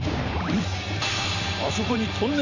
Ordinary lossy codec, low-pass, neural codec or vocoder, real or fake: none; 7.2 kHz; vocoder, 44.1 kHz, 128 mel bands every 512 samples, BigVGAN v2; fake